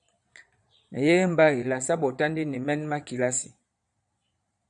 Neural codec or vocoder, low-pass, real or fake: vocoder, 22.05 kHz, 80 mel bands, Vocos; 9.9 kHz; fake